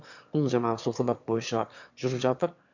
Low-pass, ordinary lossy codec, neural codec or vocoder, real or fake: 7.2 kHz; none; autoencoder, 22.05 kHz, a latent of 192 numbers a frame, VITS, trained on one speaker; fake